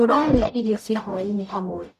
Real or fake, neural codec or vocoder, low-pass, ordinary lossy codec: fake; codec, 44.1 kHz, 0.9 kbps, DAC; 14.4 kHz; none